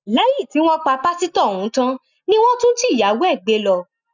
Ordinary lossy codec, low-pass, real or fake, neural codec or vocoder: none; 7.2 kHz; real; none